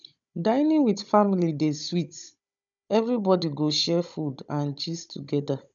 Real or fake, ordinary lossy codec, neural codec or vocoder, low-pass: fake; none; codec, 16 kHz, 16 kbps, FunCodec, trained on Chinese and English, 50 frames a second; 7.2 kHz